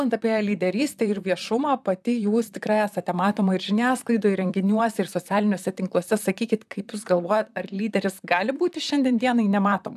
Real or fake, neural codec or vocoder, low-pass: fake; vocoder, 44.1 kHz, 128 mel bands, Pupu-Vocoder; 14.4 kHz